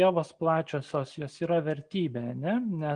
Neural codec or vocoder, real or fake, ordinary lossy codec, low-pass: none; real; Opus, 24 kbps; 9.9 kHz